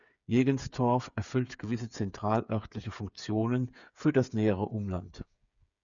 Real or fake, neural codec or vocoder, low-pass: fake; codec, 16 kHz, 8 kbps, FreqCodec, smaller model; 7.2 kHz